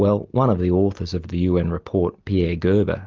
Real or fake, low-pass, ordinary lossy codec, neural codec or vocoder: real; 7.2 kHz; Opus, 24 kbps; none